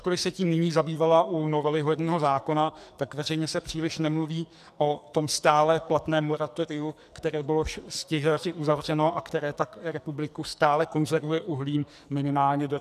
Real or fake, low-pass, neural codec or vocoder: fake; 14.4 kHz; codec, 44.1 kHz, 2.6 kbps, SNAC